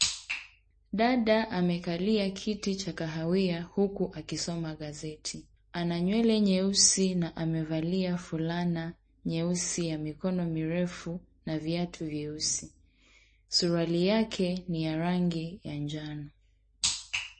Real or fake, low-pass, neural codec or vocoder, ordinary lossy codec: real; 9.9 kHz; none; MP3, 32 kbps